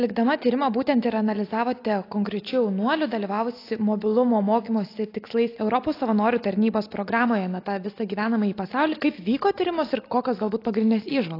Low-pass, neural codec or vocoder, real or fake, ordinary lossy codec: 5.4 kHz; none; real; AAC, 32 kbps